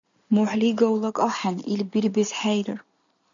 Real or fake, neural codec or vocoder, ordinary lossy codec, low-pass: real; none; MP3, 96 kbps; 7.2 kHz